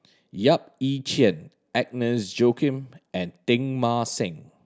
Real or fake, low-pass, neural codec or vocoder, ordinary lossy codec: real; none; none; none